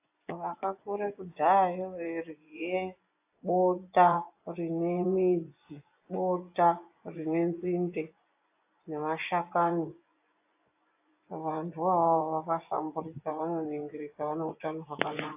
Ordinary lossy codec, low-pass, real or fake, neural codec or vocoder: AAC, 32 kbps; 3.6 kHz; fake; vocoder, 24 kHz, 100 mel bands, Vocos